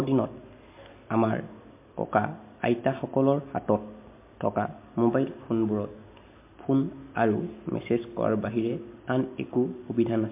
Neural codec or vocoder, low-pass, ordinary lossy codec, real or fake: none; 3.6 kHz; MP3, 24 kbps; real